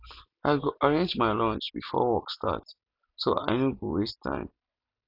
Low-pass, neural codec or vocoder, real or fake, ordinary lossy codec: 5.4 kHz; none; real; none